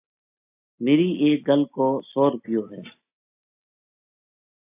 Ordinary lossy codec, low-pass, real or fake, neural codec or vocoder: AAC, 24 kbps; 3.6 kHz; real; none